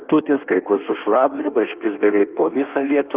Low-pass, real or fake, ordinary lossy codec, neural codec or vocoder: 3.6 kHz; fake; Opus, 24 kbps; codec, 16 kHz in and 24 kHz out, 1.1 kbps, FireRedTTS-2 codec